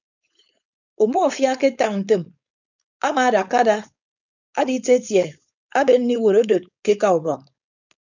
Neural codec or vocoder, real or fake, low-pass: codec, 16 kHz, 4.8 kbps, FACodec; fake; 7.2 kHz